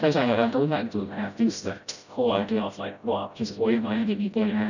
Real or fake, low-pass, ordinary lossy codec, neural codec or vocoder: fake; 7.2 kHz; none; codec, 16 kHz, 0.5 kbps, FreqCodec, smaller model